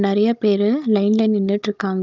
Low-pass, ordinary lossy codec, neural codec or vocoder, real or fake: 7.2 kHz; Opus, 24 kbps; codec, 16 kHz, 16 kbps, FunCodec, trained on Chinese and English, 50 frames a second; fake